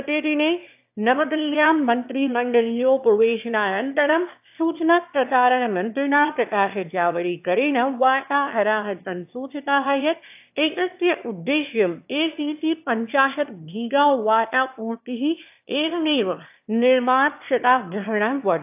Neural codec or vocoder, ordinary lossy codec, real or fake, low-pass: autoencoder, 22.05 kHz, a latent of 192 numbers a frame, VITS, trained on one speaker; AAC, 32 kbps; fake; 3.6 kHz